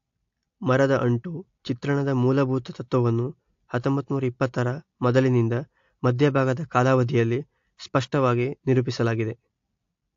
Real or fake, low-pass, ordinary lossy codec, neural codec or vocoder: real; 7.2 kHz; AAC, 48 kbps; none